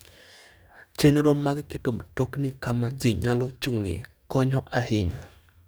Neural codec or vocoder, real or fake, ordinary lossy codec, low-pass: codec, 44.1 kHz, 2.6 kbps, DAC; fake; none; none